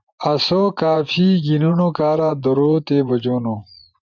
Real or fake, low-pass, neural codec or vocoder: fake; 7.2 kHz; vocoder, 24 kHz, 100 mel bands, Vocos